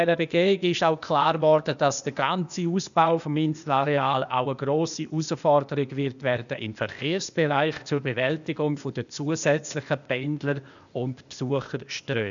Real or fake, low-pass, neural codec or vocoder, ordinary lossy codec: fake; 7.2 kHz; codec, 16 kHz, 0.8 kbps, ZipCodec; none